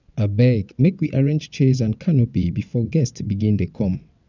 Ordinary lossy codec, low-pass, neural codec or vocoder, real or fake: none; 7.2 kHz; vocoder, 22.05 kHz, 80 mel bands, WaveNeXt; fake